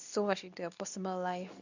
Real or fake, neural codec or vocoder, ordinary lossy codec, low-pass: fake; codec, 24 kHz, 0.9 kbps, WavTokenizer, medium speech release version 2; none; 7.2 kHz